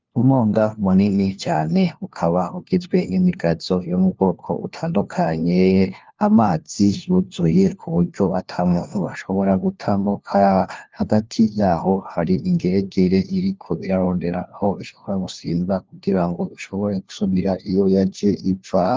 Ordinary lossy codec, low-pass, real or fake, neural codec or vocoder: Opus, 32 kbps; 7.2 kHz; fake; codec, 16 kHz, 1 kbps, FunCodec, trained on LibriTTS, 50 frames a second